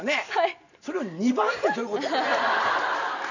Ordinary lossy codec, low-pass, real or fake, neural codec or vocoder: none; 7.2 kHz; real; none